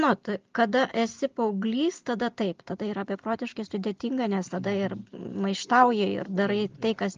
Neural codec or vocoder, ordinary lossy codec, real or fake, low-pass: none; Opus, 32 kbps; real; 7.2 kHz